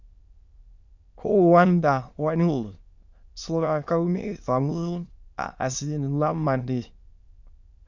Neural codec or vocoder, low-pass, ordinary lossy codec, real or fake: autoencoder, 22.05 kHz, a latent of 192 numbers a frame, VITS, trained on many speakers; 7.2 kHz; Opus, 64 kbps; fake